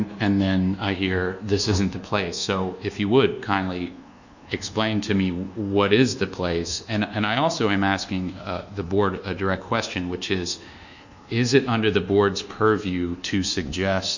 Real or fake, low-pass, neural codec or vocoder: fake; 7.2 kHz; codec, 24 kHz, 1.2 kbps, DualCodec